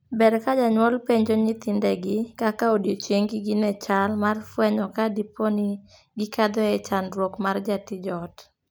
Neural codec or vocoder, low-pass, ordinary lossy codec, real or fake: none; none; none; real